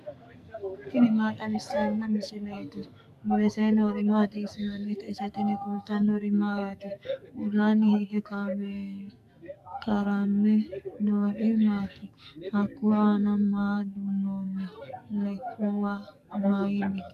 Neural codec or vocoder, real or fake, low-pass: codec, 44.1 kHz, 2.6 kbps, SNAC; fake; 14.4 kHz